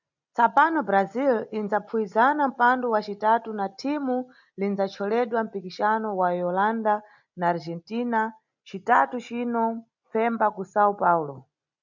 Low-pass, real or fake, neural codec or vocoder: 7.2 kHz; real; none